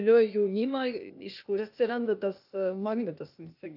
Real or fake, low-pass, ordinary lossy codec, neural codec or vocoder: fake; 5.4 kHz; AAC, 48 kbps; codec, 16 kHz, 0.8 kbps, ZipCodec